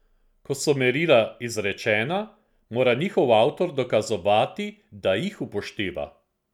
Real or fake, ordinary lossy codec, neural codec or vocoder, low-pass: real; none; none; 19.8 kHz